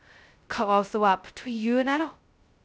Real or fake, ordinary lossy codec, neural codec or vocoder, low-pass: fake; none; codec, 16 kHz, 0.2 kbps, FocalCodec; none